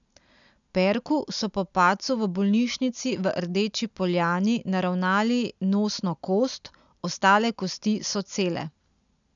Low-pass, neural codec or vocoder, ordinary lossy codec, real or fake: 7.2 kHz; none; none; real